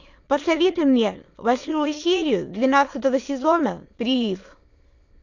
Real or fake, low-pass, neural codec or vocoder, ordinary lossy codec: fake; 7.2 kHz; autoencoder, 22.05 kHz, a latent of 192 numbers a frame, VITS, trained on many speakers; AAC, 48 kbps